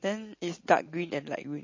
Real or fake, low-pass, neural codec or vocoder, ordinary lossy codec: real; 7.2 kHz; none; MP3, 32 kbps